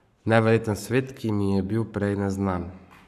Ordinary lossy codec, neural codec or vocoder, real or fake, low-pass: none; codec, 44.1 kHz, 7.8 kbps, Pupu-Codec; fake; 14.4 kHz